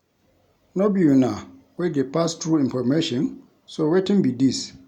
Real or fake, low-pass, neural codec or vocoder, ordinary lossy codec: real; 19.8 kHz; none; none